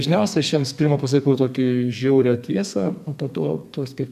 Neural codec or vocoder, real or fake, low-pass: codec, 32 kHz, 1.9 kbps, SNAC; fake; 14.4 kHz